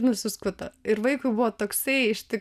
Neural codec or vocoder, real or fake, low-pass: none; real; 14.4 kHz